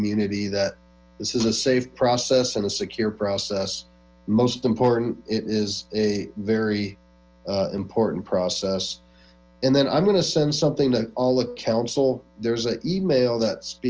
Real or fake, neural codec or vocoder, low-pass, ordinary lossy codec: real; none; 7.2 kHz; Opus, 24 kbps